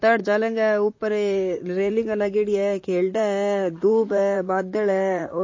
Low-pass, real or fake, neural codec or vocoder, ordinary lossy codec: 7.2 kHz; fake; vocoder, 44.1 kHz, 128 mel bands, Pupu-Vocoder; MP3, 32 kbps